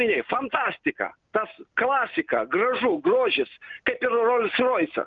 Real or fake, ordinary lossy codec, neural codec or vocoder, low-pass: real; Opus, 24 kbps; none; 7.2 kHz